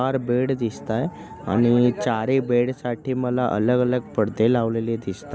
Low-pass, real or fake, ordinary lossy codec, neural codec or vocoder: none; real; none; none